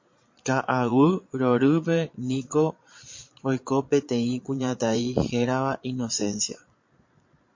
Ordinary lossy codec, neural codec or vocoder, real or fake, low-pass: MP3, 48 kbps; vocoder, 22.05 kHz, 80 mel bands, Vocos; fake; 7.2 kHz